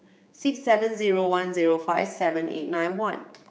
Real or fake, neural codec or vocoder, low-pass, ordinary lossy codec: fake; codec, 16 kHz, 4 kbps, X-Codec, HuBERT features, trained on general audio; none; none